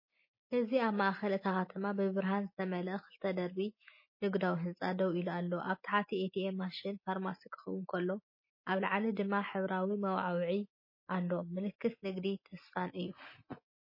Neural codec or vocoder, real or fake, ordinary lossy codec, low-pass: none; real; MP3, 24 kbps; 5.4 kHz